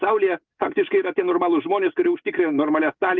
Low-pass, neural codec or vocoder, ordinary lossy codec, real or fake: 7.2 kHz; none; Opus, 24 kbps; real